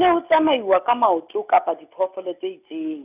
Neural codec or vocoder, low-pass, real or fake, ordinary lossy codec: none; 3.6 kHz; real; none